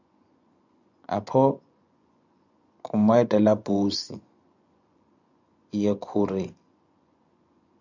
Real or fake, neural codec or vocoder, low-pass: fake; vocoder, 24 kHz, 100 mel bands, Vocos; 7.2 kHz